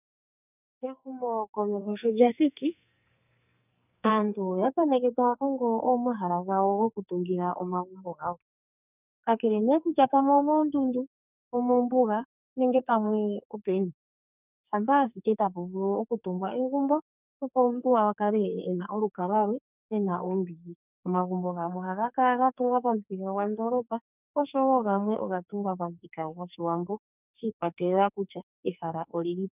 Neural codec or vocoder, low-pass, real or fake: codec, 44.1 kHz, 2.6 kbps, SNAC; 3.6 kHz; fake